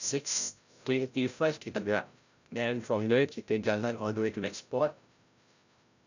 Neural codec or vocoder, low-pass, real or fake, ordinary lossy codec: codec, 16 kHz, 0.5 kbps, FreqCodec, larger model; 7.2 kHz; fake; none